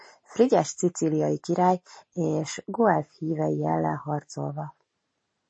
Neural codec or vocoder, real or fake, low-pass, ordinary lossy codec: none; real; 9.9 kHz; MP3, 32 kbps